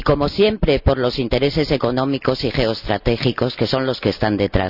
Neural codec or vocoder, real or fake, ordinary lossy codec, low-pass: none; real; none; 5.4 kHz